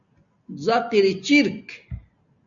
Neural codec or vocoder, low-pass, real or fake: none; 7.2 kHz; real